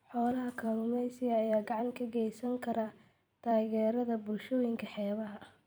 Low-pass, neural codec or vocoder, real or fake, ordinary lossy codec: none; vocoder, 44.1 kHz, 128 mel bands every 256 samples, BigVGAN v2; fake; none